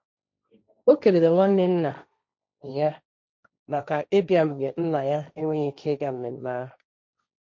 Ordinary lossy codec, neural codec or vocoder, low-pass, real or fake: none; codec, 16 kHz, 1.1 kbps, Voila-Tokenizer; none; fake